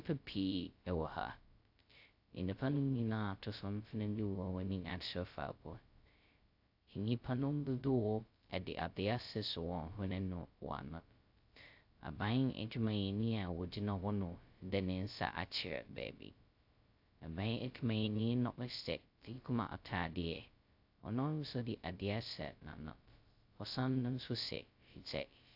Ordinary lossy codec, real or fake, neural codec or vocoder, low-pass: AAC, 48 kbps; fake; codec, 16 kHz, 0.2 kbps, FocalCodec; 5.4 kHz